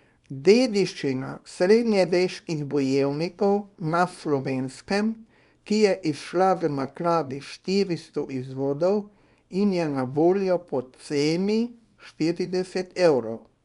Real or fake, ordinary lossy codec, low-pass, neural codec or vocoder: fake; none; 10.8 kHz; codec, 24 kHz, 0.9 kbps, WavTokenizer, small release